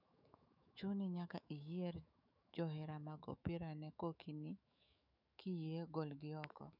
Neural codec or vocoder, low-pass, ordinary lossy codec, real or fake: autoencoder, 48 kHz, 128 numbers a frame, DAC-VAE, trained on Japanese speech; 5.4 kHz; none; fake